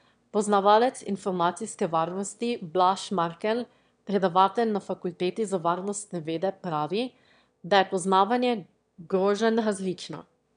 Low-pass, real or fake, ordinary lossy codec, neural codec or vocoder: 9.9 kHz; fake; none; autoencoder, 22.05 kHz, a latent of 192 numbers a frame, VITS, trained on one speaker